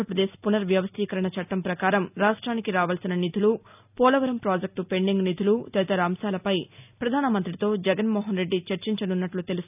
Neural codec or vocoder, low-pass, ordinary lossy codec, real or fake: none; 3.6 kHz; none; real